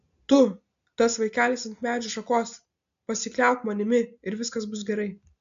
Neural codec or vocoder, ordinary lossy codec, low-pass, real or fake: none; AAC, 64 kbps; 7.2 kHz; real